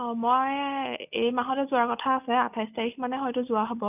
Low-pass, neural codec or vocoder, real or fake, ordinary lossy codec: 3.6 kHz; none; real; none